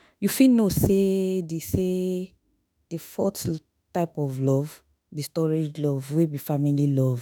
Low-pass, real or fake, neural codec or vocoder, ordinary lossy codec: none; fake; autoencoder, 48 kHz, 32 numbers a frame, DAC-VAE, trained on Japanese speech; none